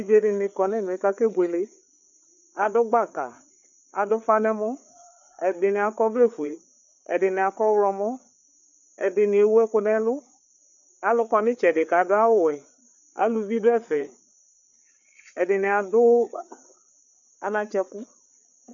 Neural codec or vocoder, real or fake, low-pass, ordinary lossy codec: codec, 16 kHz, 4 kbps, FreqCodec, larger model; fake; 7.2 kHz; MP3, 96 kbps